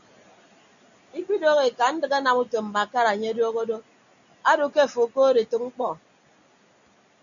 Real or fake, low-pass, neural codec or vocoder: real; 7.2 kHz; none